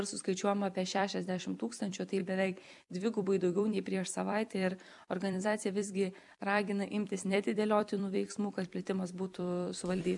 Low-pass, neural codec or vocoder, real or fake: 10.8 kHz; vocoder, 44.1 kHz, 128 mel bands every 256 samples, BigVGAN v2; fake